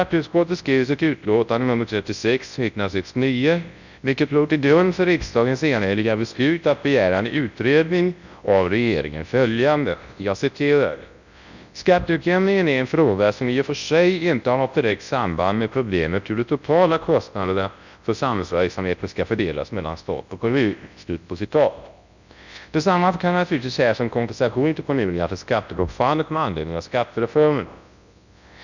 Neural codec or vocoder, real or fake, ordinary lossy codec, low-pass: codec, 24 kHz, 0.9 kbps, WavTokenizer, large speech release; fake; none; 7.2 kHz